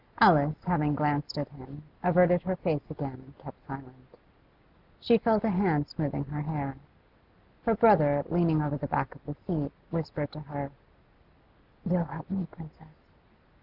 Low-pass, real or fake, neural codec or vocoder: 5.4 kHz; real; none